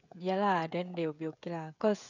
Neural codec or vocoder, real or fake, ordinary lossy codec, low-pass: codec, 16 kHz, 16 kbps, FreqCodec, smaller model; fake; none; 7.2 kHz